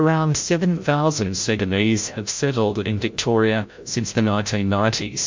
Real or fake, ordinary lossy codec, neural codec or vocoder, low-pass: fake; MP3, 48 kbps; codec, 16 kHz, 0.5 kbps, FreqCodec, larger model; 7.2 kHz